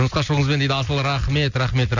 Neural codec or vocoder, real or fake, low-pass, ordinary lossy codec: none; real; 7.2 kHz; none